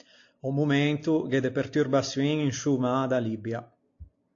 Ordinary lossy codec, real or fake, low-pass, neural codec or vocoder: AAC, 48 kbps; real; 7.2 kHz; none